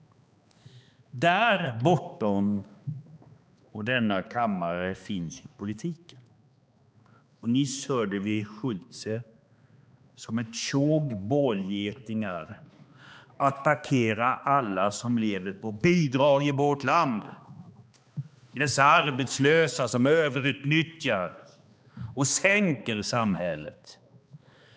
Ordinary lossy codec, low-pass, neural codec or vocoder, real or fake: none; none; codec, 16 kHz, 2 kbps, X-Codec, HuBERT features, trained on balanced general audio; fake